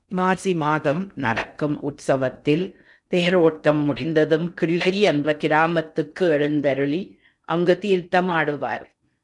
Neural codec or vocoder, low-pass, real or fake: codec, 16 kHz in and 24 kHz out, 0.6 kbps, FocalCodec, streaming, 2048 codes; 10.8 kHz; fake